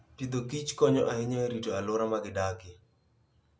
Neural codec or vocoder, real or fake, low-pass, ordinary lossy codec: none; real; none; none